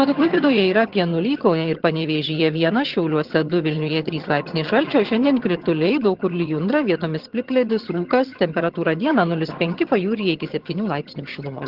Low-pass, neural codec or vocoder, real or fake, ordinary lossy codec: 5.4 kHz; vocoder, 22.05 kHz, 80 mel bands, HiFi-GAN; fake; Opus, 16 kbps